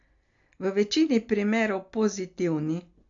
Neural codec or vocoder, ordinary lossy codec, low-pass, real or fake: none; AAC, 64 kbps; 7.2 kHz; real